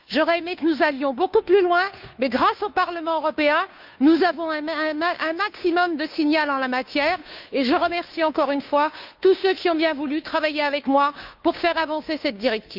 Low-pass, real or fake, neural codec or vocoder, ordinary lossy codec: 5.4 kHz; fake; codec, 16 kHz, 2 kbps, FunCodec, trained on Chinese and English, 25 frames a second; none